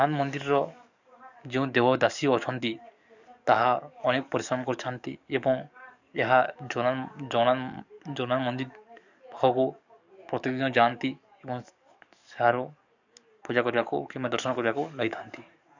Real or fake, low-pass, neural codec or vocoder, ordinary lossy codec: fake; 7.2 kHz; codec, 44.1 kHz, 7.8 kbps, DAC; none